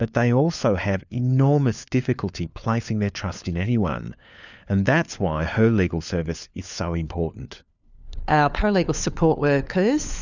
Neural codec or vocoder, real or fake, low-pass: codec, 16 kHz, 4 kbps, FunCodec, trained on LibriTTS, 50 frames a second; fake; 7.2 kHz